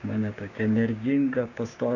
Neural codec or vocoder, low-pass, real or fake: codec, 16 kHz, 6 kbps, DAC; 7.2 kHz; fake